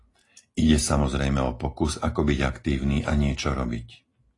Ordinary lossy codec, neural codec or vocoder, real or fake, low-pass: AAC, 32 kbps; none; real; 10.8 kHz